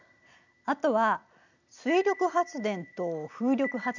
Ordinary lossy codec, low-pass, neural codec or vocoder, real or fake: none; 7.2 kHz; none; real